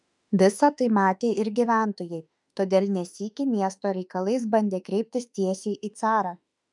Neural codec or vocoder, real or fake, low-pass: autoencoder, 48 kHz, 32 numbers a frame, DAC-VAE, trained on Japanese speech; fake; 10.8 kHz